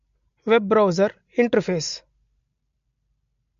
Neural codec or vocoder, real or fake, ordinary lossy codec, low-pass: none; real; MP3, 48 kbps; 7.2 kHz